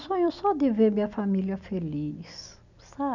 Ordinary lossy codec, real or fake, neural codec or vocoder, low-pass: none; real; none; 7.2 kHz